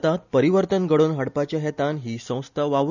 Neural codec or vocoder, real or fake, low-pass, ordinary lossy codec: none; real; 7.2 kHz; none